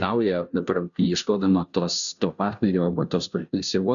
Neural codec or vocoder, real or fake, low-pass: codec, 16 kHz, 0.5 kbps, FunCodec, trained on Chinese and English, 25 frames a second; fake; 7.2 kHz